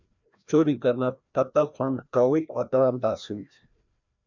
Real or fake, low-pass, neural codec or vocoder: fake; 7.2 kHz; codec, 16 kHz, 1 kbps, FreqCodec, larger model